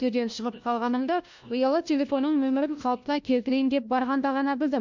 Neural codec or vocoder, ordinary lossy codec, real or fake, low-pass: codec, 16 kHz, 1 kbps, FunCodec, trained on LibriTTS, 50 frames a second; MP3, 64 kbps; fake; 7.2 kHz